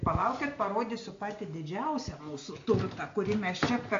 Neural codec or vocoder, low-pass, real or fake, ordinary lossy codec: none; 7.2 kHz; real; AAC, 48 kbps